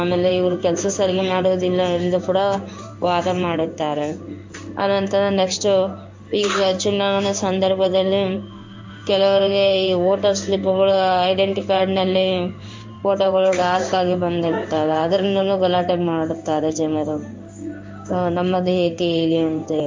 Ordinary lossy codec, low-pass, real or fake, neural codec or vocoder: MP3, 48 kbps; 7.2 kHz; fake; codec, 16 kHz in and 24 kHz out, 1 kbps, XY-Tokenizer